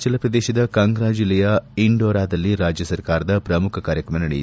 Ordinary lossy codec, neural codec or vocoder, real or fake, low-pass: none; none; real; none